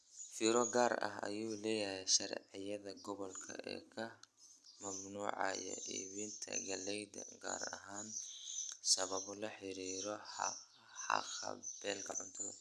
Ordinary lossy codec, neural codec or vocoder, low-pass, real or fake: none; none; none; real